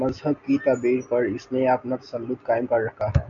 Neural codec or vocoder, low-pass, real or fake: none; 7.2 kHz; real